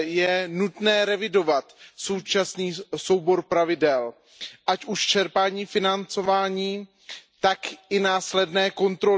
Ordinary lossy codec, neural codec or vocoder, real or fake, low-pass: none; none; real; none